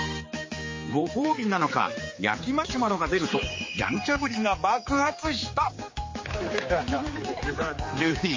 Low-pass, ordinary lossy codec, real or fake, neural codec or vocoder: 7.2 kHz; MP3, 32 kbps; fake; codec, 16 kHz, 4 kbps, X-Codec, HuBERT features, trained on general audio